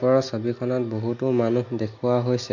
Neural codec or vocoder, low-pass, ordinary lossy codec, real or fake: none; 7.2 kHz; MP3, 48 kbps; real